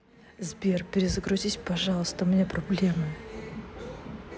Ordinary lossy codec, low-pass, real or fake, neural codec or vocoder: none; none; real; none